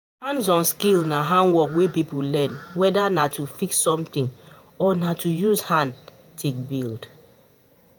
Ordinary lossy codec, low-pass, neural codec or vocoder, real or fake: none; none; vocoder, 48 kHz, 128 mel bands, Vocos; fake